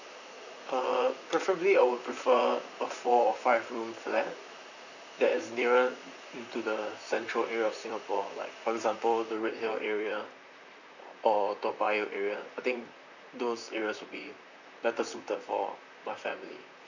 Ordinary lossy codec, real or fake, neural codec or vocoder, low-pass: none; fake; vocoder, 44.1 kHz, 128 mel bands, Pupu-Vocoder; 7.2 kHz